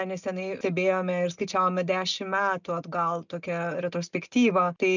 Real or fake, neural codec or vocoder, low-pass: real; none; 7.2 kHz